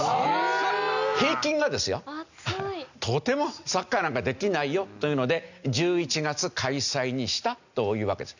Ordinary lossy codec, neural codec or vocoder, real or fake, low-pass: none; none; real; 7.2 kHz